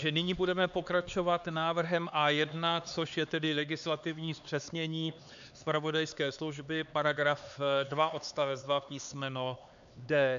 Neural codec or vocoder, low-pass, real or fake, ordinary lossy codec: codec, 16 kHz, 4 kbps, X-Codec, HuBERT features, trained on LibriSpeech; 7.2 kHz; fake; AAC, 96 kbps